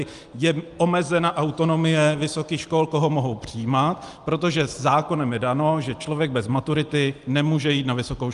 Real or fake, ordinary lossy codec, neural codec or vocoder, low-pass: real; Opus, 32 kbps; none; 10.8 kHz